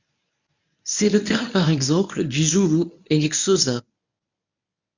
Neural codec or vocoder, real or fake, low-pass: codec, 24 kHz, 0.9 kbps, WavTokenizer, medium speech release version 1; fake; 7.2 kHz